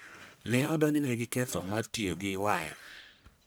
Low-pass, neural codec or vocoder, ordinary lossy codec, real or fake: none; codec, 44.1 kHz, 1.7 kbps, Pupu-Codec; none; fake